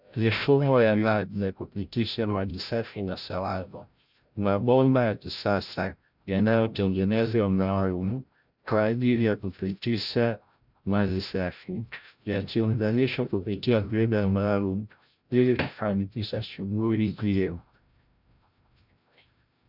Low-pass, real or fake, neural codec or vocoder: 5.4 kHz; fake; codec, 16 kHz, 0.5 kbps, FreqCodec, larger model